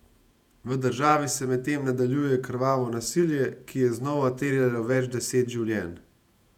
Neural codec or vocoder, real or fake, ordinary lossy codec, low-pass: vocoder, 48 kHz, 128 mel bands, Vocos; fake; none; 19.8 kHz